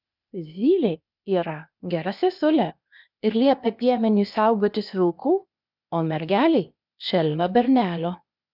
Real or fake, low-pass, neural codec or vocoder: fake; 5.4 kHz; codec, 16 kHz, 0.8 kbps, ZipCodec